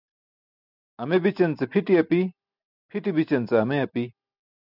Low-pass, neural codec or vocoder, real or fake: 5.4 kHz; none; real